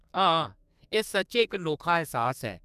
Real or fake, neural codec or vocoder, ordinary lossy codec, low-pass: fake; codec, 32 kHz, 1.9 kbps, SNAC; none; 14.4 kHz